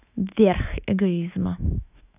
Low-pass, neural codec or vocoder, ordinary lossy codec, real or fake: 3.6 kHz; autoencoder, 48 kHz, 128 numbers a frame, DAC-VAE, trained on Japanese speech; none; fake